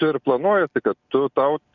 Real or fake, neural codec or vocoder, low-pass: real; none; 7.2 kHz